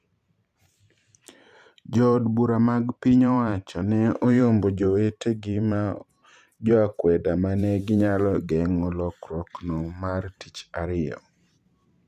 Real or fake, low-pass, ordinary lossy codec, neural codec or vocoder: fake; 14.4 kHz; none; vocoder, 44.1 kHz, 128 mel bands every 512 samples, BigVGAN v2